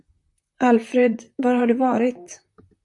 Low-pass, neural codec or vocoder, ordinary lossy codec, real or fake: 10.8 kHz; vocoder, 44.1 kHz, 128 mel bands, Pupu-Vocoder; AAC, 64 kbps; fake